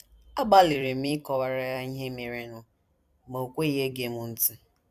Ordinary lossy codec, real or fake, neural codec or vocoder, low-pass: none; fake; vocoder, 44.1 kHz, 128 mel bands every 256 samples, BigVGAN v2; 14.4 kHz